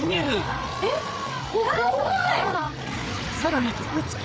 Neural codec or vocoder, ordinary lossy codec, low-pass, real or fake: codec, 16 kHz, 8 kbps, FreqCodec, larger model; none; none; fake